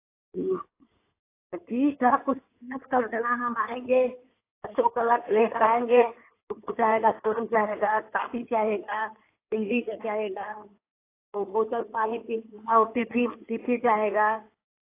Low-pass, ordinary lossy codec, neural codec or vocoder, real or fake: 3.6 kHz; AAC, 24 kbps; codec, 16 kHz in and 24 kHz out, 2.2 kbps, FireRedTTS-2 codec; fake